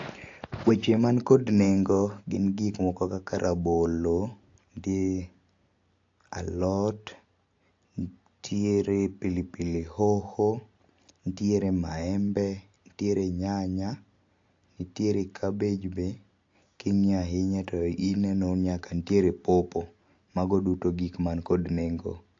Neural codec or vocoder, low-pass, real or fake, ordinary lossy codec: none; 7.2 kHz; real; AAC, 48 kbps